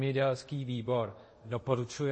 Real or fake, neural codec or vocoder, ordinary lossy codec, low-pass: fake; codec, 24 kHz, 0.5 kbps, DualCodec; MP3, 32 kbps; 9.9 kHz